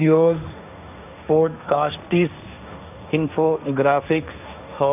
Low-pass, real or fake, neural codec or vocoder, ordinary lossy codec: 3.6 kHz; fake; codec, 16 kHz, 1.1 kbps, Voila-Tokenizer; none